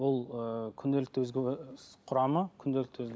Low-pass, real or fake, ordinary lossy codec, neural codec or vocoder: none; real; none; none